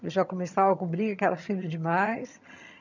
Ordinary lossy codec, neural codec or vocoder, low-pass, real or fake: none; vocoder, 22.05 kHz, 80 mel bands, HiFi-GAN; 7.2 kHz; fake